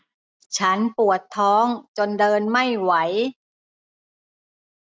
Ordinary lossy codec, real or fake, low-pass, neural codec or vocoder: none; real; none; none